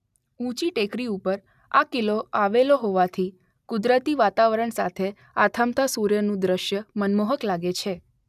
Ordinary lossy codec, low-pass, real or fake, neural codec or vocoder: none; 14.4 kHz; real; none